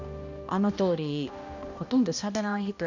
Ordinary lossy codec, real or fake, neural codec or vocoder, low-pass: none; fake; codec, 16 kHz, 1 kbps, X-Codec, HuBERT features, trained on balanced general audio; 7.2 kHz